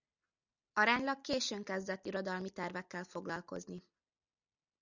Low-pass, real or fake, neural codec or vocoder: 7.2 kHz; real; none